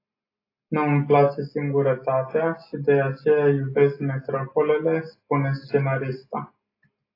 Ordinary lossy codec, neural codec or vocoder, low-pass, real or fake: AAC, 24 kbps; none; 5.4 kHz; real